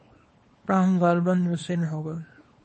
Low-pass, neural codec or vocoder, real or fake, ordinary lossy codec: 10.8 kHz; codec, 24 kHz, 0.9 kbps, WavTokenizer, small release; fake; MP3, 32 kbps